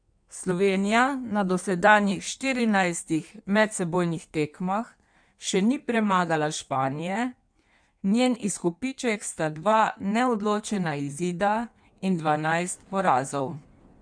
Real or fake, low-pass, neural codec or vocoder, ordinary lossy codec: fake; 9.9 kHz; codec, 16 kHz in and 24 kHz out, 1.1 kbps, FireRedTTS-2 codec; none